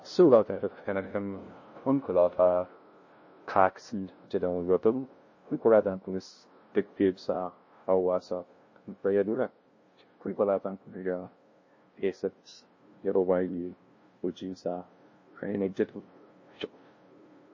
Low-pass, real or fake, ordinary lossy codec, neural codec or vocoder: 7.2 kHz; fake; MP3, 32 kbps; codec, 16 kHz, 0.5 kbps, FunCodec, trained on LibriTTS, 25 frames a second